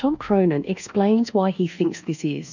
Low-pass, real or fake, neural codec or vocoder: 7.2 kHz; fake; codec, 24 kHz, 1.2 kbps, DualCodec